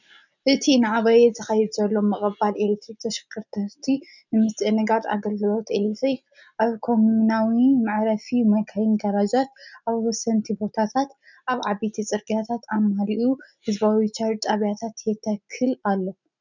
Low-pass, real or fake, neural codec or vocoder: 7.2 kHz; real; none